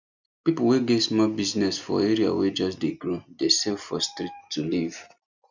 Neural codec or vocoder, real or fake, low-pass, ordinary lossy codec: none; real; 7.2 kHz; none